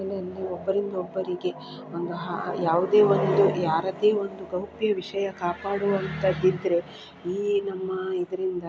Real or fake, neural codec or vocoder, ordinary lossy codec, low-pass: real; none; none; none